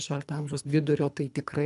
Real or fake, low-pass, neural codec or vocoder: fake; 10.8 kHz; codec, 24 kHz, 3 kbps, HILCodec